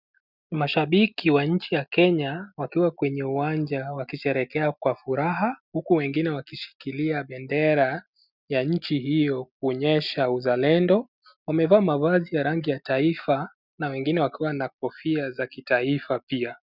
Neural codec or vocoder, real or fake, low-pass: none; real; 5.4 kHz